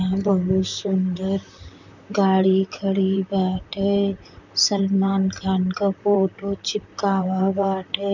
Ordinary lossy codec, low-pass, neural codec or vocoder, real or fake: none; 7.2 kHz; vocoder, 44.1 kHz, 128 mel bands, Pupu-Vocoder; fake